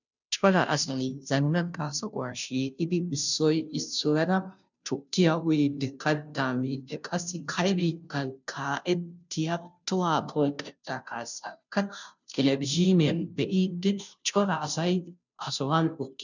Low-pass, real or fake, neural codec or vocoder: 7.2 kHz; fake; codec, 16 kHz, 0.5 kbps, FunCodec, trained on Chinese and English, 25 frames a second